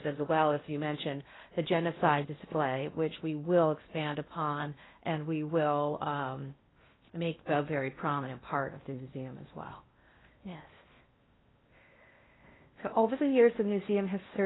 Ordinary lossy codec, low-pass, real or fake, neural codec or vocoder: AAC, 16 kbps; 7.2 kHz; fake; codec, 16 kHz in and 24 kHz out, 0.6 kbps, FocalCodec, streaming, 4096 codes